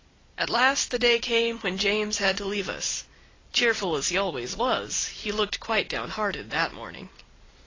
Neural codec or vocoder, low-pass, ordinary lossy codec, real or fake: none; 7.2 kHz; AAC, 32 kbps; real